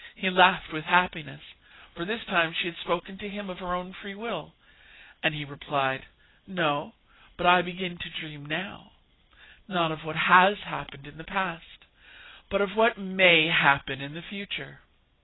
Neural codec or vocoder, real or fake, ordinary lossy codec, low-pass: none; real; AAC, 16 kbps; 7.2 kHz